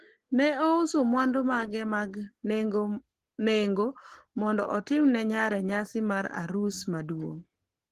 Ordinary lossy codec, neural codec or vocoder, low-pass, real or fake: Opus, 16 kbps; codec, 44.1 kHz, 7.8 kbps, Pupu-Codec; 14.4 kHz; fake